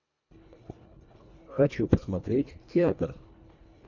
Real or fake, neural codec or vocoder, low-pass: fake; codec, 24 kHz, 1.5 kbps, HILCodec; 7.2 kHz